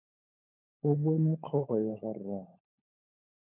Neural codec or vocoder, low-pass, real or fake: codec, 16 kHz, 16 kbps, FunCodec, trained on LibriTTS, 50 frames a second; 3.6 kHz; fake